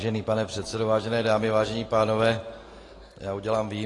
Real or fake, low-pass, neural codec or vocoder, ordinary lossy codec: real; 10.8 kHz; none; AAC, 32 kbps